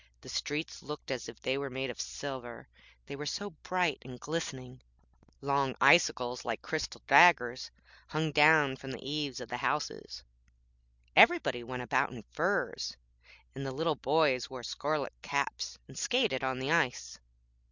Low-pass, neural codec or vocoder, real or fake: 7.2 kHz; none; real